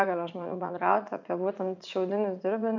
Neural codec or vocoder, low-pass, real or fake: vocoder, 44.1 kHz, 80 mel bands, Vocos; 7.2 kHz; fake